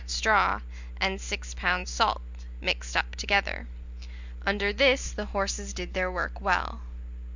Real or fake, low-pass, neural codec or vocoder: real; 7.2 kHz; none